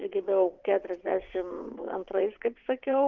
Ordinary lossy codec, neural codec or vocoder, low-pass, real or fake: Opus, 24 kbps; vocoder, 22.05 kHz, 80 mel bands, WaveNeXt; 7.2 kHz; fake